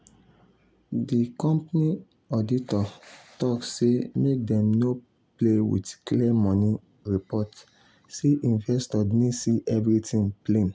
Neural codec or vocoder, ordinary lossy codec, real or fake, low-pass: none; none; real; none